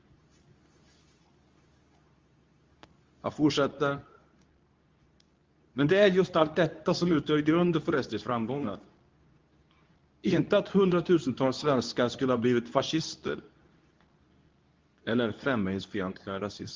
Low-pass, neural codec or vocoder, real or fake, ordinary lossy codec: 7.2 kHz; codec, 24 kHz, 0.9 kbps, WavTokenizer, medium speech release version 2; fake; Opus, 32 kbps